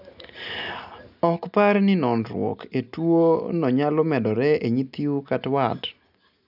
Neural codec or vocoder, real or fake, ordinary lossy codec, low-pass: none; real; none; 5.4 kHz